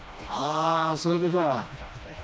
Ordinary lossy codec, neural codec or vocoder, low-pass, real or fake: none; codec, 16 kHz, 1 kbps, FreqCodec, smaller model; none; fake